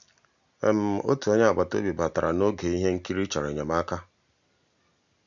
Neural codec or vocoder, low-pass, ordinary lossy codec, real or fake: none; 7.2 kHz; none; real